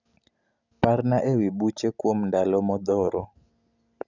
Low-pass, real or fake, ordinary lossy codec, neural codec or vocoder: 7.2 kHz; real; none; none